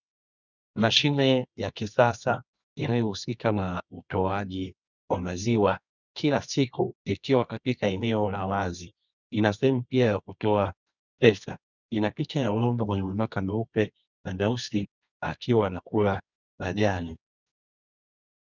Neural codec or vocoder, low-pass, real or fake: codec, 24 kHz, 0.9 kbps, WavTokenizer, medium music audio release; 7.2 kHz; fake